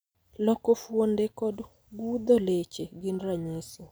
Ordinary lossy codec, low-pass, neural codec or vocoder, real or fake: none; none; none; real